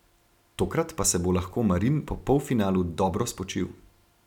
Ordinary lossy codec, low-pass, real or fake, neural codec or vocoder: none; 19.8 kHz; real; none